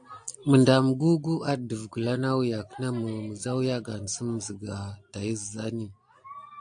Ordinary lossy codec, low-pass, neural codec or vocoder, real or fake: AAC, 64 kbps; 9.9 kHz; none; real